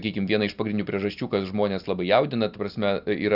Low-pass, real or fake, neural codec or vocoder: 5.4 kHz; real; none